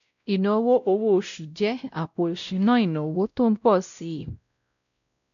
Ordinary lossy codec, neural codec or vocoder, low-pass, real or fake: none; codec, 16 kHz, 0.5 kbps, X-Codec, WavLM features, trained on Multilingual LibriSpeech; 7.2 kHz; fake